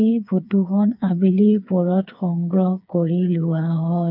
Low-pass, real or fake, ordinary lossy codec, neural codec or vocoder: 5.4 kHz; fake; MP3, 48 kbps; codec, 16 kHz, 4 kbps, FreqCodec, smaller model